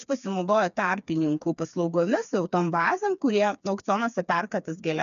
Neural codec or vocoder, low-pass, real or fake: codec, 16 kHz, 4 kbps, FreqCodec, smaller model; 7.2 kHz; fake